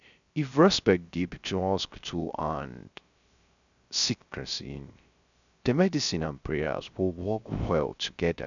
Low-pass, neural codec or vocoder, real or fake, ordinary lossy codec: 7.2 kHz; codec, 16 kHz, 0.3 kbps, FocalCodec; fake; none